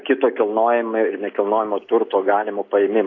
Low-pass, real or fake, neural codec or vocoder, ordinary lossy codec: 7.2 kHz; real; none; AAC, 32 kbps